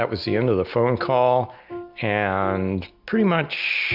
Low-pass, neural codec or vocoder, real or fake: 5.4 kHz; none; real